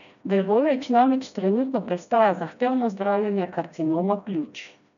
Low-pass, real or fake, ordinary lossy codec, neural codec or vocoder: 7.2 kHz; fake; none; codec, 16 kHz, 1 kbps, FreqCodec, smaller model